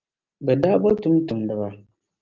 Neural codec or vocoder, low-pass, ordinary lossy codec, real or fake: none; 7.2 kHz; Opus, 32 kbps; real